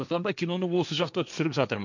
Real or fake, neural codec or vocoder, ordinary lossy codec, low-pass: fake; codec, 16 kHz, 1.1 kbps, Voila-Tokenizer; none; 7.2 kHz